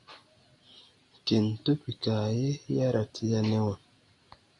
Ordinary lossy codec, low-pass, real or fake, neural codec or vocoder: AAC, 48 kbps; 10.8 kHz; real; none